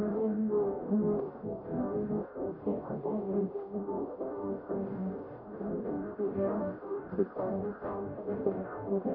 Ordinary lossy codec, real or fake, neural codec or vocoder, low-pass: none; fake; codec, 44.1 kHz, 0.9 kbps, DAC; 5.4 kHz